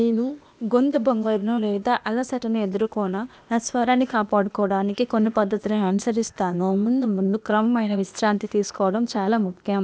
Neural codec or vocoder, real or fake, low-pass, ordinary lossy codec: codec, 16 kHz, 0.8 kbps, ZipCodec; fake; none; none